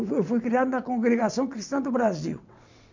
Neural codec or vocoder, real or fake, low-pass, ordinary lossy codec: vocoder, 22.05 kHz, 80 mel bands, WaveNeXt; fake; 7.2 kHz; MP3, 64 kbps